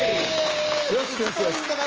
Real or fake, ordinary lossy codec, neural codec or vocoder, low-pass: fake; Opus, 24 kbps; codec, 16 kHz, 6 kbps, DAC; 7.2 kHz